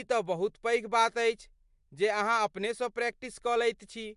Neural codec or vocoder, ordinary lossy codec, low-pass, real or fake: none; MP3, 64 kbps; 10.8 kHz; real